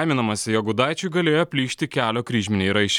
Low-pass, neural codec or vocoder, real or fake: 19.8 kHz; none; real